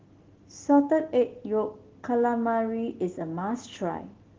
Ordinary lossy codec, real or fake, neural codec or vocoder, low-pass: Opus, 16 kbps; real; none; 7.2 kHz